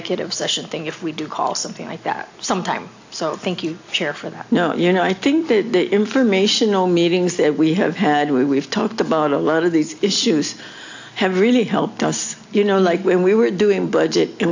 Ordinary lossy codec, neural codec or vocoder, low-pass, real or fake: AAC, 48 kbps; none; 7.2 kHz; real